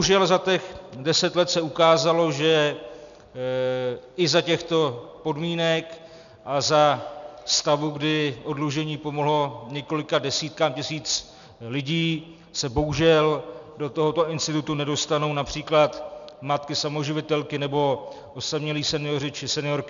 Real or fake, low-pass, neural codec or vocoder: real; 7.2 kHz; none